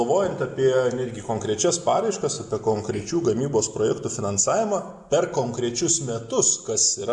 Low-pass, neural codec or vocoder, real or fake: 10.8 kHz; none; real